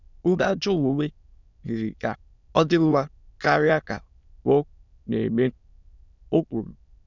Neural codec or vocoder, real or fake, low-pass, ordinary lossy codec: autoencoder, 22.05 kHz, a latent of 192 numbers a frame, VITS, trained on many speakers; fake; 7.2 kHz; none